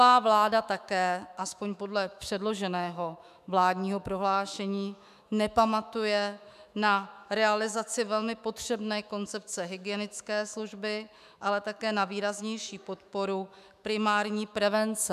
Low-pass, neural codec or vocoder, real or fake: 14.4 kHz; autoencoder, 48 kHz, 128 numbers a frame, DAC-VAE, trained on Japanese speech; fake